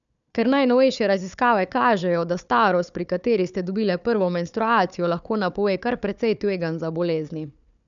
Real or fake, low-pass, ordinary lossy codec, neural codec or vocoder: fake; 7.2 kHz; none; codec, 16 kHz, 4 kbps, FunCodec, trained on Chinese and English, 50 frames a second